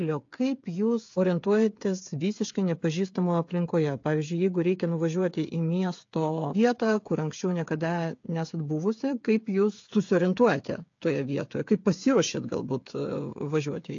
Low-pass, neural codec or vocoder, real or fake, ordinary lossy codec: 7.2 kHz; codec, 16 kHz, 8 kbps, FreqCodec, smaller model; fake; AAC, 48 kbps